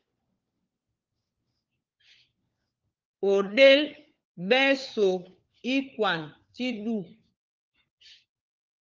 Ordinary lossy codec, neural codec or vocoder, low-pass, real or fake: Opus, 24 kbps; codec, 16 kHz, 4 kbps, FunCodec, trained on LibriTTS, 50 frames a second; 7.2 kHz; fake